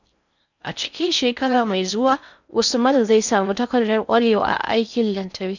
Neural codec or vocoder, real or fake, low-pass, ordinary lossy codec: codec, 16 kHz in and 24 kHz out, 0.6 kbps, FocalCodec, streaming, 4096 codes; fake; 7.2 kHz; none